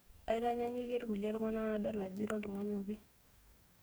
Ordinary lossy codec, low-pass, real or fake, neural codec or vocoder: none; none; fake; codec, 44.1 kHz, 2.6 kbps, DAC